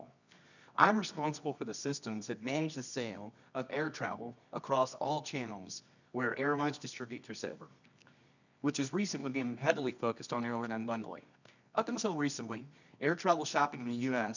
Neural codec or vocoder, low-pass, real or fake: codec, 24 kHz, 0.9 kbps, WavTokenizer, medium music audio release; 7.2 kHz; fake